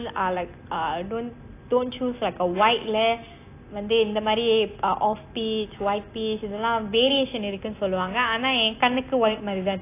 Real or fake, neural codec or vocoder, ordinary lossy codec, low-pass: real; none; AAC, 24 kbps; 3.6 kHz